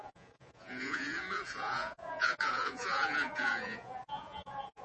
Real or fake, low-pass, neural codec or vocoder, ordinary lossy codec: fake; 10.8 kHz; vocoder, 48 kHz, 128 mel bands, Vocos; MP3, 32 kbps